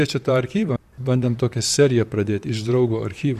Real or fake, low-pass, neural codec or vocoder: fake; 14.4 kHz; vocoder, 44.1 kHz, 128 mel bands, Pupu-Vocoder